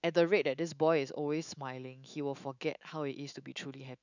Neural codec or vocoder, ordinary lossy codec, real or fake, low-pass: autoencoder, 48 kHz, 128 numbers a frame, DAC-VAE, trained on Japanese speech; none; fake; 7.2 kHz